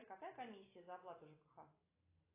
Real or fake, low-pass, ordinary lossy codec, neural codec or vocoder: real; 3.6 kHz; AAC, 24 kbps; none